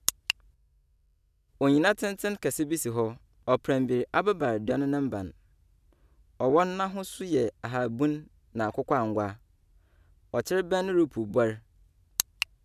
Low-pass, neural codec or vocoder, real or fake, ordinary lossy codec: 14.4 kHz; none; real; none